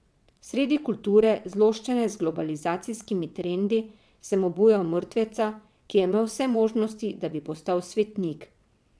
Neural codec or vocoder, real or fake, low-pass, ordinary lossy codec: vocoder, 22.05 kHz, 80 mel bands, WaveNeXt; fake; none; none